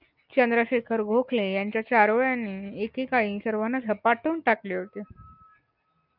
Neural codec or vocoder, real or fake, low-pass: none; real; 5.4 kHz